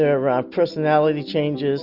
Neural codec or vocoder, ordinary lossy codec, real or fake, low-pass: none; Opus, 64 kbps; real; 5.4 kHz